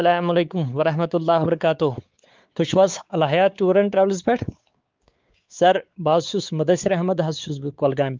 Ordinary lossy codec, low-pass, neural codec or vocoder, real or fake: Opus, 24 kbps; 7.2 kHz; codec, 16 kHz, 4 kbps, X-Codec, WavLM features, trained on Multilingual LibriSpeech; fake